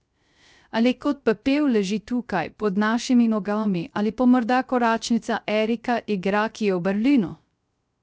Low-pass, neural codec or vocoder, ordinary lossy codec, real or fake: none; codec, 16 kHz, 0.3 kbps, FocalCodec; none; fake